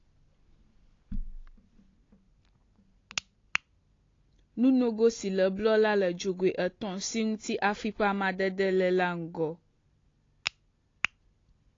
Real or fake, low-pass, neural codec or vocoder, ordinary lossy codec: real; 7.2 kHz; none; AAC, 32 kbps